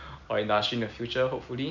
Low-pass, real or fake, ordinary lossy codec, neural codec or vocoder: 7.2 kHz; real; none; none